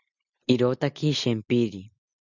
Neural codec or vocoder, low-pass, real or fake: none; 7.2 kHz; real